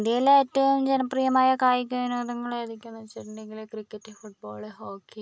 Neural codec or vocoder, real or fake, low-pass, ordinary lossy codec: none; real; none; none